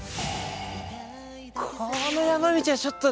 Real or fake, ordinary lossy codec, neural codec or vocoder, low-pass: real; none; none; none